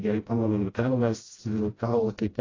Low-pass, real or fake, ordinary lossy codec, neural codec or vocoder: 7.2 kHz; fake; AAC, 32 kbps; codec, 16 kHz, 0.5 kbps, FreqCodec, smaller model